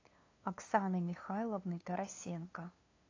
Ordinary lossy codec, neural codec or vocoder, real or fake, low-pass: AAC, 32 kbps; codec, 16 kHz, 2 kbps, FunCodec, trained on LibriTTS, 25 frames a second; fake; 7.2 kHz